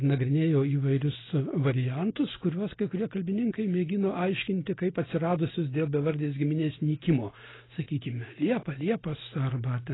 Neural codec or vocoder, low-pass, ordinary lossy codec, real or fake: none; 7.2 kHz; AAC, 16 kbps; real